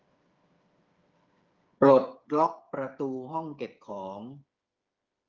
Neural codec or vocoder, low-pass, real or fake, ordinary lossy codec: codec, 16 kHz, 8 kbps, FreqCodec, smaller model; 7.2 kHz; fake; Opus, 24 kbps